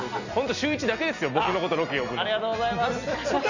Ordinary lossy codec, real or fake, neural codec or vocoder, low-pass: none; real; none; 7.2 kHz